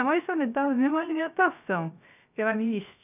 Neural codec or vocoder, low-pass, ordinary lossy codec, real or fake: codec, 16 kHz, 0.3 kbps, FocalCodec; 3.6 kHz; none; fake